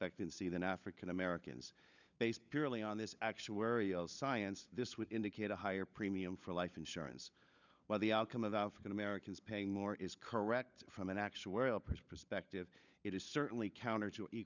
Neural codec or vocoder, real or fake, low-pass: codec, 16 kHz, 16 kbps, FunCodec, trained on LibriTTS, 50 frames a second; fake; 7.2 kHz